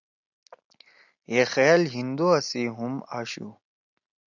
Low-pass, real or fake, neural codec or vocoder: 7.2 kHz; real; none